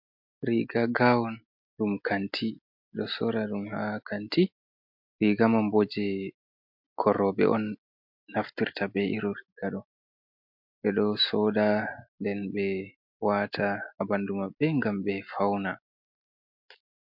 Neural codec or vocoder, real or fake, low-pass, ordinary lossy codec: none; real; 5.4 kHz; MP3, 48 kbps